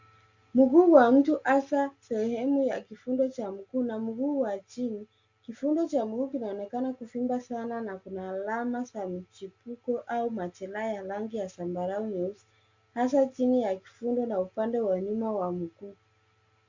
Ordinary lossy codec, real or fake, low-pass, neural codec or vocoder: Opus, 64 kbps; real; 7.2 kHz; none